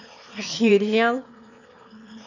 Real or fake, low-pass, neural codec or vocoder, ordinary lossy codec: fake; 7.2 kHz; autoencoder, 22.05 kHz, a latent of 192 numbers a frame, VITS, trained on one speaker; none